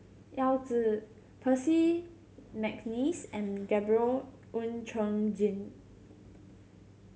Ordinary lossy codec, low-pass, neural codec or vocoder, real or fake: none; none; none; real